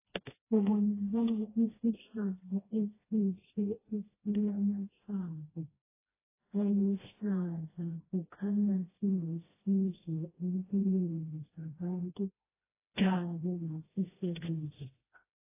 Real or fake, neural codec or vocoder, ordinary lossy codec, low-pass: fake; codec, 16 kHz, 1 kbps, FreqCodec, smaller model; AAC, 16 kbps; 3.6 kHz